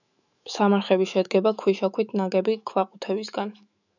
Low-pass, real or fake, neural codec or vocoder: 7.2 kHz; fake; autoencoder, 48 kHz, 128 numbers a frame, DAC-VAE, trained on Japanese speech